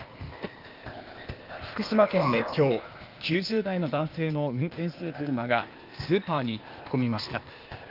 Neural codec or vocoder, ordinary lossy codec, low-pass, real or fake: codec, 16 kHz, 0.8 kbps, ZipCodec; Opus, 32 kbps; 5.4 kHz; fake